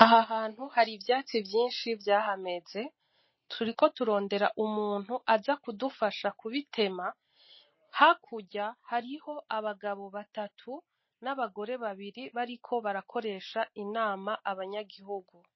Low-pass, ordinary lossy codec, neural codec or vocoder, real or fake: 7.2 kHz; MP3, 24 kbps; none; real